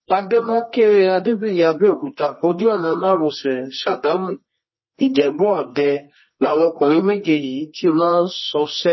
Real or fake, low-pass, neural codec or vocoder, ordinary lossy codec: fake; 7.2 kHz; codec, 24 kHz, 0.9 kbps, WavTokenizer, medium music audio release; MP3, 24 kbps